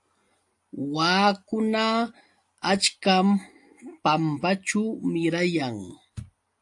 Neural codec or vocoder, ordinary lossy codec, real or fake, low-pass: none; AAC, 64 kbps; real; 10.8 kHz